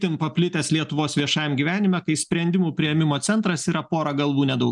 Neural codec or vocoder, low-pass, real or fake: none; 10.8 kHz; real